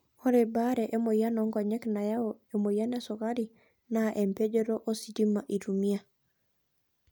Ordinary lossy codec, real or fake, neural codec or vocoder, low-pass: none; real; none; none